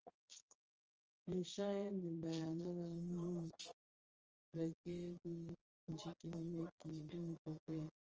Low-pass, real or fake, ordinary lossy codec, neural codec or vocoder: 7.2 kHz; fake; Opus, 24 kbps; vocoder, 22.05 kHz, 80 mel bands, Vocos